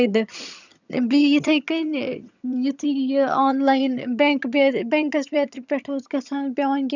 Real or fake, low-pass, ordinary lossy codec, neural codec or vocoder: fake; 7.2 kHz; none; vocoder, 22.05 kHz, 80 mel bands, HiFi-GAN